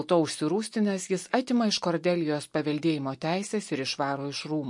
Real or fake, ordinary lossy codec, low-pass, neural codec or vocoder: real; MP3, 48 kbps; 10.8 kHz; none